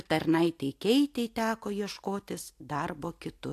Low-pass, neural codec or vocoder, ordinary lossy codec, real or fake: 14.4 kHz; none; AAC, 64 kbps; real